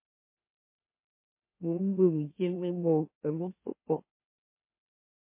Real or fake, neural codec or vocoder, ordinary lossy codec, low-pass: fake; autoencoder, 44.1 kHz, a latent of 192 numbers a frame, MeloTTS; MP3, 24 kbps; 3.6 kHz